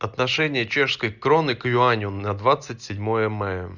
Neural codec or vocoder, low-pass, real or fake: none; 7.2 kHz; real